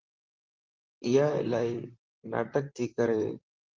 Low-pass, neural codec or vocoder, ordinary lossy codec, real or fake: 7.2 kHz; vocoder, 44.1 kHz, 128 mel bands, Pupu-Vocoder; Opus, 32 kbps; fake